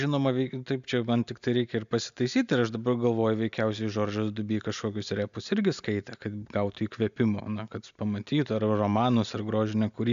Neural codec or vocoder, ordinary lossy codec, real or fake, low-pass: none; AAC, 96 kbps; real; 7.2 kHz